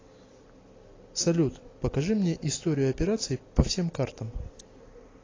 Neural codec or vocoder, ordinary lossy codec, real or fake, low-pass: none; AAC, 32 kbps; real; 7.2 kHz